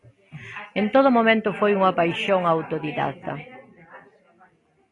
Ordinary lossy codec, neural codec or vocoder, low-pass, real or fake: AAC, 32 kbps; none; 10.8 kHz; real